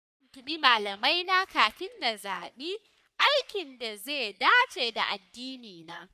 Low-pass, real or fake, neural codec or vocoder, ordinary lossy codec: 14.4 kHz; fake; codec, 44.1 kHz, 3.4 kbps, Pupu-Codec; none